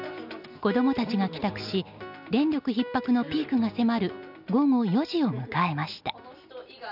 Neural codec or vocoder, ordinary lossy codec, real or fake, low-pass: none; none; real; 5.4 kHz